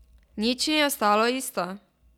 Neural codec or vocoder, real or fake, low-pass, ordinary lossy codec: none; real; 19.8 kHz; none